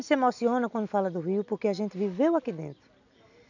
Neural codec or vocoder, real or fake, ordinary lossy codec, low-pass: none; real; none; 7.2 kHz